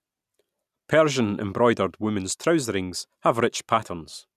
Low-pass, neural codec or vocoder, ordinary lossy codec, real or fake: 14.4 kHz; none; none; real